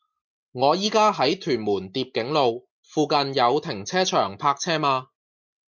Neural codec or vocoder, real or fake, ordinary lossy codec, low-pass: none; real; MP3, 64 kbps; 7.2 kHz